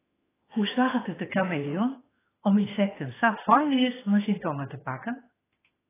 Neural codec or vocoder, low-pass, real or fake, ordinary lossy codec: autoencoder, 48 kHz, 32 numbers a frame, DAC-VAE, trained on Japanese speech; 3.6 kHz; fake; AAC, 16 kbps